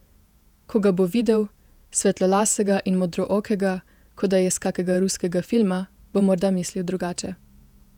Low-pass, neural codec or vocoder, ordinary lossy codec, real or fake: 19.8 kHz; vocoder, 48 kHz, 128 mel bands, Vocos; none; fake